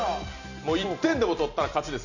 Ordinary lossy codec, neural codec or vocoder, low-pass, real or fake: none; none; 7.2 kHz; real